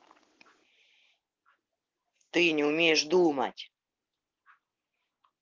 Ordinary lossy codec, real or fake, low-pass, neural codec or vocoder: Opus, 16 kbps; real; 7.2 kHz; none